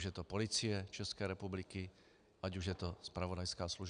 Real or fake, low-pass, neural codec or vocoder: real; 9.9 kHz; none